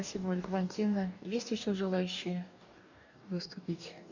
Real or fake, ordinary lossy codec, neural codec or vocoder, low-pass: fake; none; codec, 44.1 kHz, 2.6 kbps, DAC; 7.2 kHz